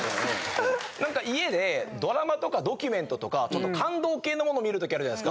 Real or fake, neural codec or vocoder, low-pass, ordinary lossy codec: real; none; none; none